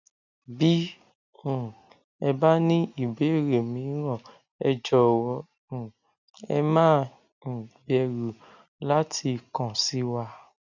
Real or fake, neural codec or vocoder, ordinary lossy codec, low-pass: real; none; none; 7.2 kHz